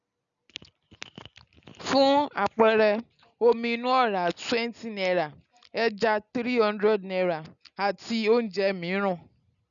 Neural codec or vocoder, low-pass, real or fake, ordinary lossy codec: none; 7.2 kHz; real; none